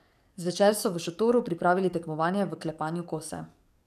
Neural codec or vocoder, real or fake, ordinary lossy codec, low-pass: codec, 44.1 kHz, 7.8 kbps, DAC; fake; none; 14.4 kHz